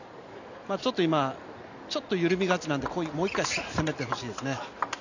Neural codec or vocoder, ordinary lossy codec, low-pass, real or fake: none; none; 7.2 kHz; real